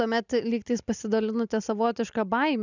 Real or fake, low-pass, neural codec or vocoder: real; 7.2 kHz; none